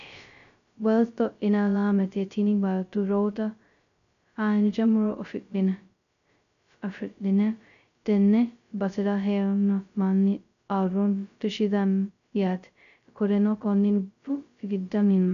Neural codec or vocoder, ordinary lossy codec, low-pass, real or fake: codec, 16 kHz, 0.2 kbps, FocalCodec; MP3, 96 kbps; 7.2 kHz; fake